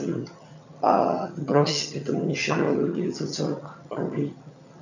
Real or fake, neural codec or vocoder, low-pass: fake; vocoder, 22.05 kHz, 80 mel bands, HiFi-GAN; 7.2 kHz